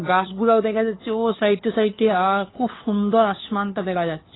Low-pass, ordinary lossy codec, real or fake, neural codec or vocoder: 7.2 kHz; AAC, 16 kbps; fake; codec, 16 kHz, 2 kbps, FunCodec, trained on LibriTTS, 25 frames a second